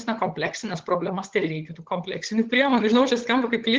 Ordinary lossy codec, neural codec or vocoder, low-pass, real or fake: Opus, 16 kbps; codec, 16 kHz, 16 kbps, FunCodec, trained on LibriTTS, 50 frames a second; 7.2 kHz; fake